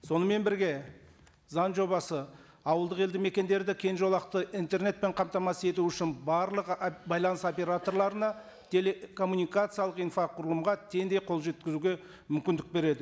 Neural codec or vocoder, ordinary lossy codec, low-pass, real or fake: none; none; none; real